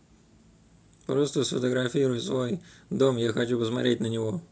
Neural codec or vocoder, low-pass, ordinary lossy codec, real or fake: none; none; none; real